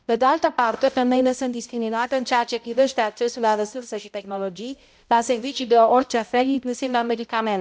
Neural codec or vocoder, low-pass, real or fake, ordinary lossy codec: codec, 16 kHz, 0.5 kbps, X-Codec, HuBERT features, trained on balanced general audio; none; fake; none